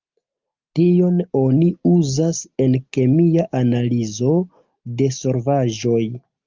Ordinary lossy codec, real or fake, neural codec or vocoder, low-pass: Opus, 24 kbps; real; none; 7.2 kHz